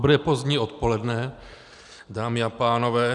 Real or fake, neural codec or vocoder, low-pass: real; none; 10.8 kHz